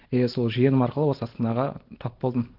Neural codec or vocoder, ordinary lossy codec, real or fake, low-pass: none; Opus, 16 kbps; real; 5.4 kHz